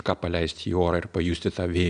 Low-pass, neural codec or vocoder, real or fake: 9.9 kHz; none; real